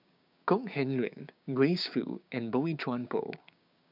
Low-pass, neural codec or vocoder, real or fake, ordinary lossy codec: 5.4 kHz; codec, 16 kHz, 6 kbps, DAC; fake; none